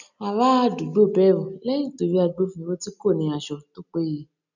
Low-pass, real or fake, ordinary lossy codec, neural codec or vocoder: 7.2 kHz; real; none; none